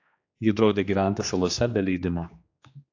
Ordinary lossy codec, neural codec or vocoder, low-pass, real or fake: AAC, 48 kbps; codec, 16 kHz, 2 kbps, X-Codec, HuBERT features, trained on general audio; 7.2 kHz; fake